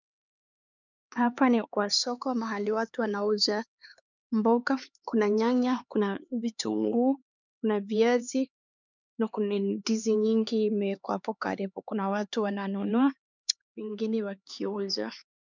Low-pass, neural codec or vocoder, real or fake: 7.2 kHz; codec, 16 kHz, 4 kbps, X-Codec, HuBERT features, trained on LibriSpeech; fake